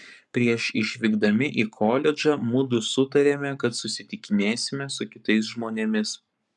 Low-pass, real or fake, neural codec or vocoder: 10.8 kHz; fake; codec, 44.1 kHz, 7.8 kbps, Pupu-Codec